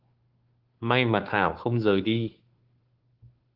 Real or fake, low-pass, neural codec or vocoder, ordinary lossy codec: fake; 5.4 kHz; codec, 16 kHz, 2 kbps, FunCodec, trained on Chinese and English, 25 frames a second; Opus, 16 kbps